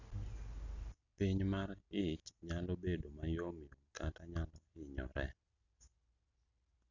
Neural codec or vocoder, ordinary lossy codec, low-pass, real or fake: none; none; 7.2 kHz; real